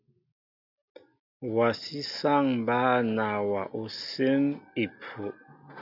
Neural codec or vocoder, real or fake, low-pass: none; real; 5.4 kHz